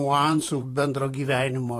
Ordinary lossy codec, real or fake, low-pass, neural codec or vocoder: AAC, 48 kbps; fake; 14.4 kHz; vocoder, 44.1 kHz, 128 mel bands, Pupu-Vocoder